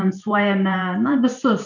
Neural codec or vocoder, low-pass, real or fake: vocoder, 44.1 kHz, 128 mel bands every 512 samples, BigVGAN v2; 7.2 kHz; fake